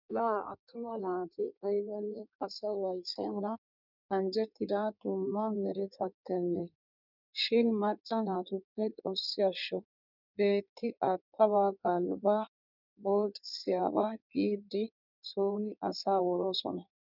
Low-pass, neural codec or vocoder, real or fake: 5.4 kHz; codec, 16 kHz in and 24 kHz out, 1.1 kbps, FireRedTTS-2 codec; fake